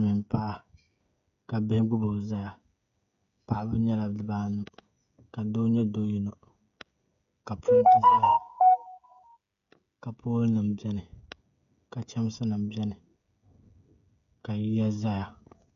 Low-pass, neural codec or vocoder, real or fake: 7.2 kHz; codec, 16 kHz, 16 kbps, FreqCodec, smaller model; fake